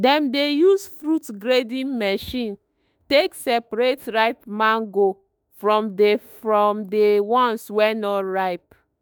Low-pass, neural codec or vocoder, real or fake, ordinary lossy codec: none; autoencoder, 48 kHz, 32 numbers a frame, DAC-VAE, trained on Japanese speech; fake; none